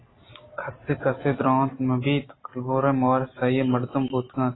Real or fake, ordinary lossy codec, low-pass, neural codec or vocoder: real; AAC, 16 kbps; 7.2 kHz; none